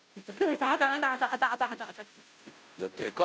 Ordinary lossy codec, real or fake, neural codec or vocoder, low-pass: none; fake; codec, 16 kHz, 0.5 kbps, FunCodec, trained on Chinese and English, 25 frames a second; none